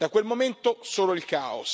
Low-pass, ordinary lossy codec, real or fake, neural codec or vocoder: none; none; real; none